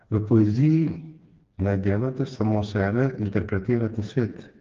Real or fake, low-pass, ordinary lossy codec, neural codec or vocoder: fake; 7.2 kHz; Opus, 32 kbps; codec, 16 kHz, 2 kbps, FreqCodec, smaller model